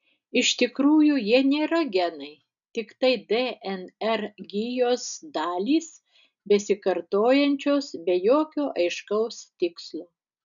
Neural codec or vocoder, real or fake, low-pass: none; real; 7.2 kHz